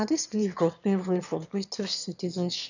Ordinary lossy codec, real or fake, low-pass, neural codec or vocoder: none; fake; 7.2 kHz; autoencoder, 22.05 kHz, a latent of 192 numbers a frame, VITS, trained on one speaker